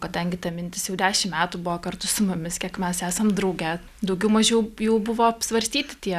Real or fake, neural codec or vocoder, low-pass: real; none; 14.4 kHz